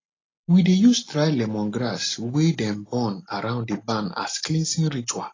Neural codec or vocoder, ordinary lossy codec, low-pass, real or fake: none; AAC, 32 kbps; 7.2 kHz; real